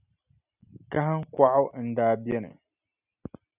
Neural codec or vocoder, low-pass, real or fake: none; 3.6 kHz; real